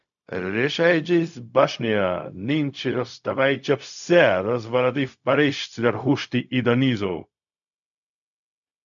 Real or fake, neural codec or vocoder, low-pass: fake; codec, 16 kHz, 0.4 kbps, LongCat-Audio-Codec; 7.2 kHz